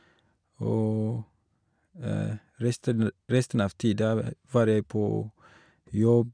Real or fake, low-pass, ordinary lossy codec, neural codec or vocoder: real; 9.9 kHz; none; none